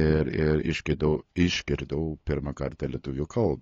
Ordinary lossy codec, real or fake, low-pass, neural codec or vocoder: AAC, 32 kbps; fake; 7.2 kHz; codec, 16 kHz, 8 kbps, FunCodec, trained on LibriTTS, 25 frames a second